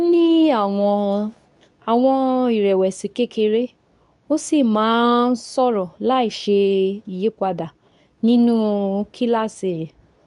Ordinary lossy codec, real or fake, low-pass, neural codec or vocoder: none; fake; 10.8 kHz; codec, 24 kHz, 0.9 kbps, WavTokenizer, medium speech release version 2